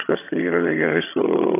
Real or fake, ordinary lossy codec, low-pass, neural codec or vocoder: fake; AAC, 24 kbps; 3.6 kHz; vocoder, 22.05 kHz, 80 mel bands, HiFi-GAN